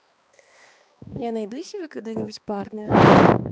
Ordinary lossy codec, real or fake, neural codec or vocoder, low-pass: none; fake; codec, 16 kHz, 2 kbps, X-Codec, HuBERT features, trained on balanced general audio; none